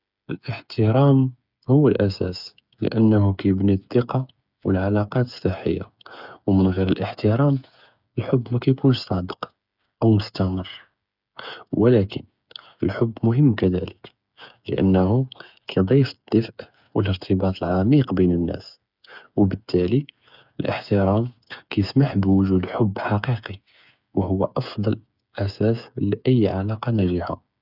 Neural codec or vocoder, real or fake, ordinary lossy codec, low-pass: codec, 16 kHz, 8 kbps, FreqCodec, smaller model; fake; none; 5.4 kHz